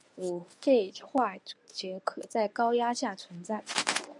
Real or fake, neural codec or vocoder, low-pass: fake; codec, 24 kHz, 0.9 kbps, WavTokenizer, medium speech release version 1; 10.8 kHz